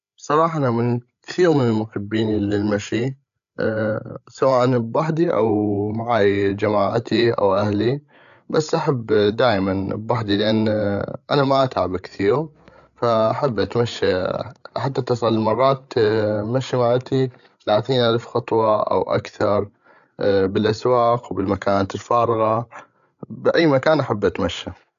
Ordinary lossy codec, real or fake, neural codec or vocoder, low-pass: none; fake; codec, 16 kHz, 8 kbps, FreqCodec, larger model; 7.2 kHz